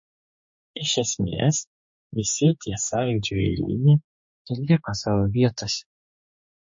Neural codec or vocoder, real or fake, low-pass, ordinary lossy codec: codec, 16 kHz, 4 kbps, X-Codec, HuBERT features, trained on general audio; fake; 7.2 kHz; MP3, 32 kbps